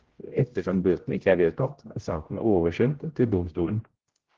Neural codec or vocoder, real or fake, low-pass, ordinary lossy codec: codec, 16 kHz, 0.5 kbps, X-Codec, HuBERT features, trained on general audio; fake; 7.2 kHz; Opus, 16 kbps